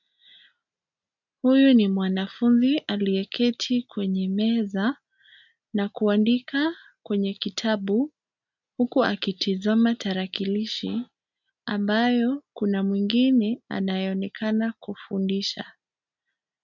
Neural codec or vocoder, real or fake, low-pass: none; real; 7.2 kHz